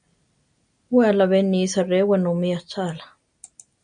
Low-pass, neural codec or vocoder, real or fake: 9.9 kHz; none; real